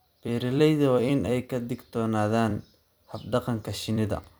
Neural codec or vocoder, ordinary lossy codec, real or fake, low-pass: vocoder, 44.1 kHz, 128 mel bands every 256 samples, BigVGAN v2; none; fake; none